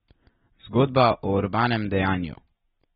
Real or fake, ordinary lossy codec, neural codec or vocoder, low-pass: real; AAC, 16 kbps; none; 7.2 kHz